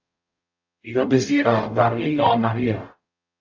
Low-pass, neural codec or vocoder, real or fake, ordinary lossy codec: 7.2 kHz; codec, 44.1 kHz, 0.9 kbps, DAC; fake; AAC, 48 kbps